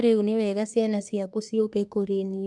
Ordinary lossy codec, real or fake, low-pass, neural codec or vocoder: none; fake; 10.8 kHz; codec, 24 kHz, 1 kbps, SNAC